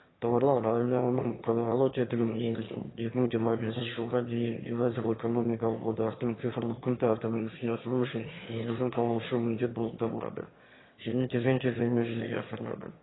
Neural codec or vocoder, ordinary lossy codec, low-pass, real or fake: autoencoder, 22.05 kHz, a latent of 192 numbers a frame, VITS, trained on one speaker; AAC, 16 kbps; 7.2 kHz; fake